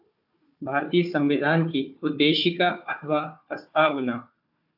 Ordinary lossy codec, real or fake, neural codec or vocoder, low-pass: AAC, 48 kbps; fake; codec, 16 kHz, 4 kbps, FunCodec, trained on Chinese and English, 50 frames a second; 5.4 kHz